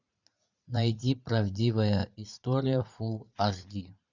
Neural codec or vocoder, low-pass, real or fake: vocoder, 44.1 kHz, 80 mel bands, Vocos; 7.2 kHz; fake